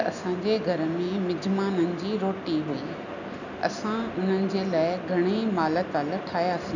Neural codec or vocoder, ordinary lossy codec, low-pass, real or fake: none; none; 7.2 kHz; real